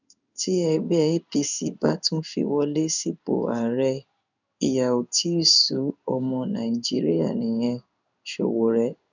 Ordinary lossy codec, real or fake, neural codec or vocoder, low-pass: none; fake; codec, 16 kHz in and 24 kHz out, 1 kbps, XY-Tokenizer; 7.2 kHz